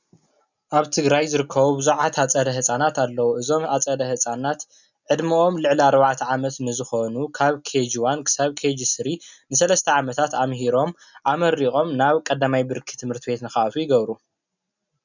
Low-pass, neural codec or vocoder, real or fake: 7.2 kHz; none; real